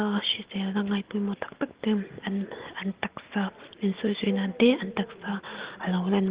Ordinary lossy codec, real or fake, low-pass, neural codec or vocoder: Opus, 16 kbps; real; 3.6 kHz; none